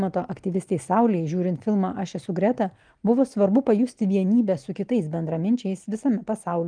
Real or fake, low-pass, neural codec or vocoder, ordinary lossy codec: real; 9.9 kHz; none; Opus, 32 kbps